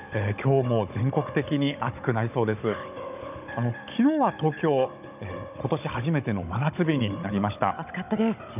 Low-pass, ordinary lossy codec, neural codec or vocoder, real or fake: 3.6 kHz; none; codec, 16 kHz, 16 kbps, FunCodec, trained on Chinese and English, 50 frames a second; fake